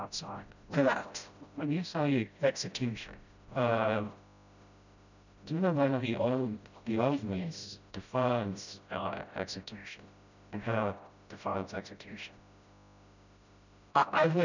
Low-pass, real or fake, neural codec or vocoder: 7.2 kHz; fake; codec, 16 kHz, 0.5 kbps, FreqCodec, smaller model